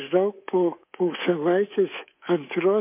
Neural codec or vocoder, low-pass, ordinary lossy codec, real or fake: codec, 24 kHz, 3.1 kbps, DualCodec; 3.6 kHz; MP3, 24 kbps; fake